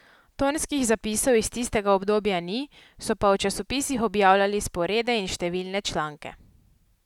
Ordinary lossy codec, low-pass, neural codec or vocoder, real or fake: none; 19.8 kHz; none; real